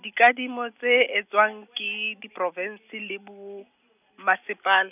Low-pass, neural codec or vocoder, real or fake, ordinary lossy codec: 3.6 kHz; none; real; none